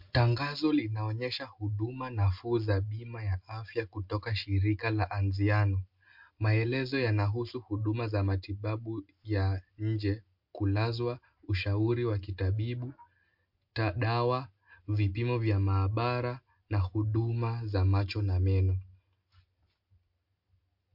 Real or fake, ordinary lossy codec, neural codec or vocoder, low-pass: real; MP3, 48 kbps; none; 5.4 kHz